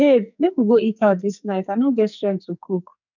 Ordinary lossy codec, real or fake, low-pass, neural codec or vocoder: none; fake; 7.2 kHz; codec, 44.1 kHz, 2.6 kbps, SNAC